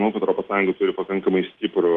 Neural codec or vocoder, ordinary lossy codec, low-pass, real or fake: none; Opus, 16 kbps; 10.8 kHz; real